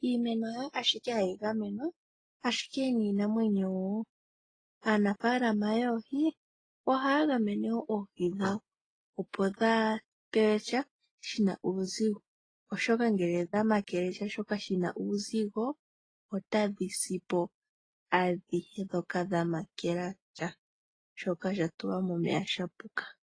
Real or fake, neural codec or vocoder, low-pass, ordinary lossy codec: real; none; 9.9 kHz; AAC, 32 kbps